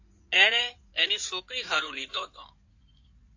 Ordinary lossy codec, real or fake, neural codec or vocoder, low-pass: AAC, 48 kbps; fake; codec, 16 kHz in and 24 kHz out, 2.2 kbps, FireRedTTS-2 codec; 7.2 kHz